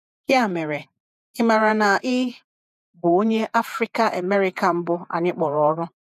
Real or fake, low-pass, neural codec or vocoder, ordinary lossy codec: fake; 14.4 kHz; vocoder, 48 kHz, 128 mel bands, Vocos; none